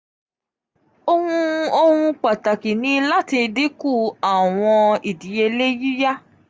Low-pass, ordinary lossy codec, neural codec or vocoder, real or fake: none; none; none; real